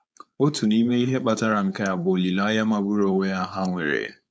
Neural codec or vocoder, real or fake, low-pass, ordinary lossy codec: codec, 16 kHz, 4.8 kbps, FACodec; fake; none; none